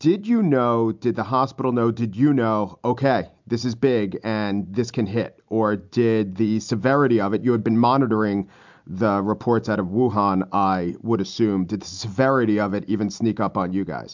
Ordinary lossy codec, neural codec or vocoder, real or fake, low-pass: MP3, 64 kbps; none; real; 7.2 kHz